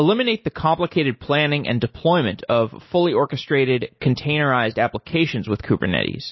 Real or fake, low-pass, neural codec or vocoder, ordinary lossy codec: real; 7.2 kHz; none; MP3, 24 kbps